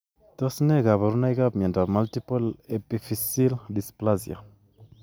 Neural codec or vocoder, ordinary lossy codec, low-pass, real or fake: none; none; none; real